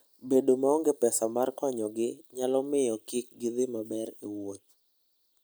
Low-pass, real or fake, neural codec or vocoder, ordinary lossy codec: none; real; none; none